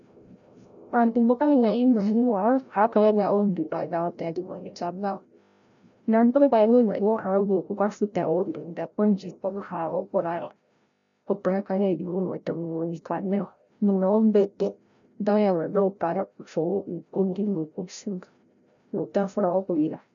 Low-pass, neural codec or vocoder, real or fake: 7.2 kHz; codec, 16 kHz, 0.5 kbps, FreqCodec, larger model; fake